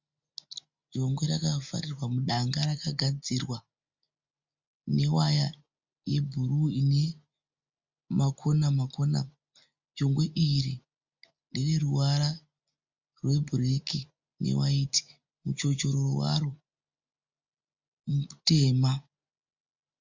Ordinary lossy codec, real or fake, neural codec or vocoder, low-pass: MP3, 64 kbps; real; none; 7.2 kHz